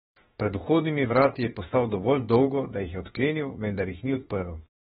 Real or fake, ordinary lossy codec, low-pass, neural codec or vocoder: fake; AAC, 16 kbps; 19.8 kHz; autoencoder, 48 kHz, 32 numbers a frame, DAC-VAE, trained on Japanese speech